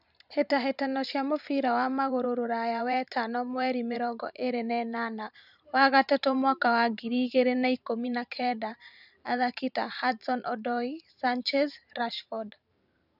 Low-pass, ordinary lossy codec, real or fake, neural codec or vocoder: 5.4 kHz; none; fake; vocoder, 44.1 kHz, 128 mel bands every 512 samples, BigVGAN v2